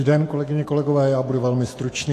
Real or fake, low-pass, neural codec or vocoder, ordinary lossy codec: fake; 14.4 kHz; autoencoder, 48 kHz, 128 numbers a frame, DAC-VAE, trained on Japanese speech; AAC, 48 kbps